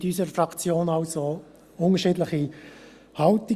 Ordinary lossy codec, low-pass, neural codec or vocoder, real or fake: Opus, 64 kbps; 14.4 kHz; none; real